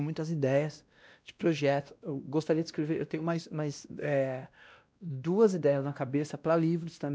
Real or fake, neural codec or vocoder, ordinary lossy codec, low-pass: fake; codec, 16 kHz, 1 kbps, X-Codec, WavLM features, trained on Multilingual LibriSpeech; none; none